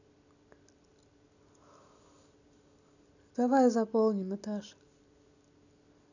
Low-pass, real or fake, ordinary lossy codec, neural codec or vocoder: 7.2 kHz; real; none; none